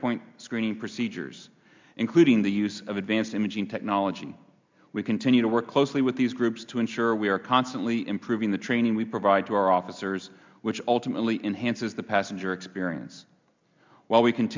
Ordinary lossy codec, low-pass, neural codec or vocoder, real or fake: MP3, 64 kbps; 7.2 kHz; none; real